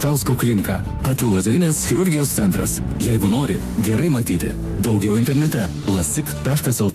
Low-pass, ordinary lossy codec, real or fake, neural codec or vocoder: 14.4 kHz; AAC, 96 kbps; fake; autoencoder, 48 kHz, 32 numbers a frame, DAC-VAE, trained on Japanese speech